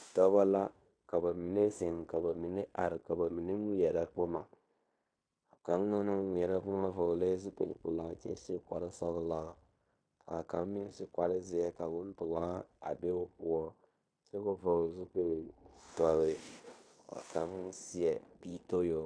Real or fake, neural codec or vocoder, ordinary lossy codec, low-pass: fake; codec, 16 kHz in and 24 kHz out, 0.9 kbps, LongCat-Audio-Codec, fine tuned four codebook decoder; AAC, 64 kbps; 9.9 kHz